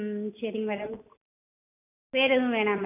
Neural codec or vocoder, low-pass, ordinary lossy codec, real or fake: none; 3.6 kHz; none; real